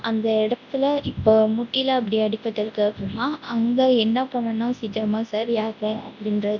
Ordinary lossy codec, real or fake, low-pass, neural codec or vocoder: none; fake; 7.2 kHz; codec, 24 kHz, 0.9 kbps, WavTokenizer, large speech release